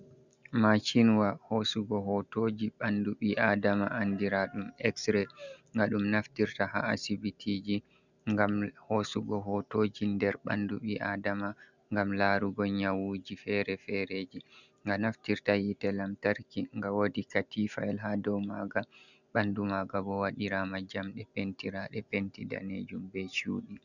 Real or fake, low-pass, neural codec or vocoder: real; 7.2 kHz; none